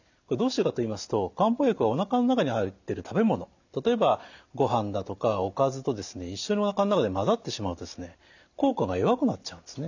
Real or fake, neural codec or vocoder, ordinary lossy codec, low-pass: real; none; none; 7.2 kHz